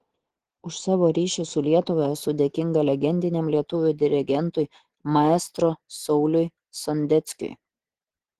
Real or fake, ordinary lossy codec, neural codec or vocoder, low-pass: real; Opus, 16 kbps; none; 14.4 kHz